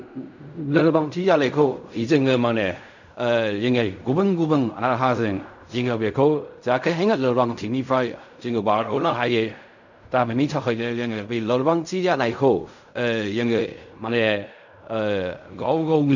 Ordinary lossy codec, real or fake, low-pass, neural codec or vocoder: none; fake; 7.2 kHz; codec, 16 kHz in and 24 kHz out, 0.4 kbps, LongCat-Audio-Codec, fine tuned four codebook decoder